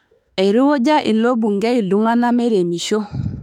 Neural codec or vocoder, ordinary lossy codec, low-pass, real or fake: autoencoder, 48 kHz, 32 numbers a frame, DAC-VAE, trained on Japanese speech; none; 19.8 kHz; fake